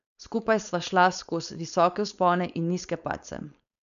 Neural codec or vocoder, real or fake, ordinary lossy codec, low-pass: codec, 16 kHz, 4.8 kbps, FACodec; fake; none; 7.2 kHz